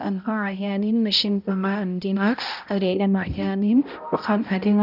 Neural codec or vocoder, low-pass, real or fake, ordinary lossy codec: codec, 16 kHz, 0.5 kbps, X-Codec, HuBERT features, trained on balanced general audio; 5.4 kHz; fake; none